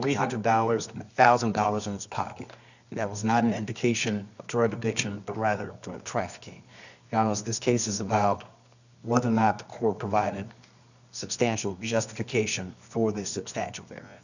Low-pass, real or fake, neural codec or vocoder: 7.2 kHz; fake; codec, 24 kHz, 0.9 kbps, WavTokenizer, medium music audio release